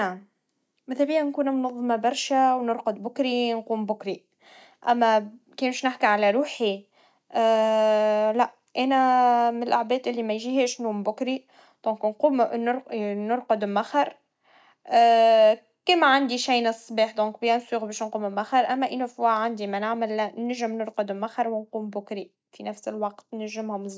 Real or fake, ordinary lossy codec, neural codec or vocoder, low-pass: real; none; none; none